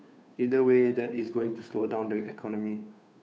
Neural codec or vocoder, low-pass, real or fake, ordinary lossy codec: codec, 16 kHz, 2 kbps, FunCodec, trained on Chinese and English, 25 frames a second; none; fake; none